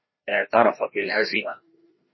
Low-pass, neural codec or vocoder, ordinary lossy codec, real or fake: 7.2 kHz; codec, 16 kHz, 1 kbps, FreqCodec, larger model; MP3, 24 kbps; fake